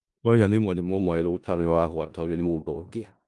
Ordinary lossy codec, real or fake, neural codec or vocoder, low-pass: Opus, 32 kbps; fake; codec, 16 kHz in and 24 kHz out, 0.4 kbps, LongCat-Audio-Codec, four codebook decoder; 10.8 kHz